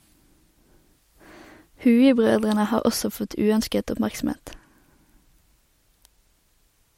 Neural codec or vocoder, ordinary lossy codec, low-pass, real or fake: none; MP3, 64 kbps; 19.8 kHz; real